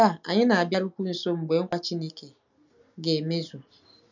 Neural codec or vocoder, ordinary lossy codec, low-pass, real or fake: none; none; 7.2 kHz; real